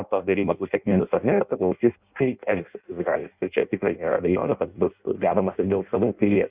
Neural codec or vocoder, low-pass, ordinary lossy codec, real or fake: codec, 16 kHz in and 24 kHz out, 0.6 kbps, FireRedTTS-2 codec; 3.6 kHz; Opus, 64 kbps; fake